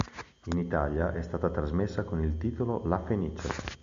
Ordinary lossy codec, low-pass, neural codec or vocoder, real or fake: AAC, 64 kbps; 7.2 kHz; none; real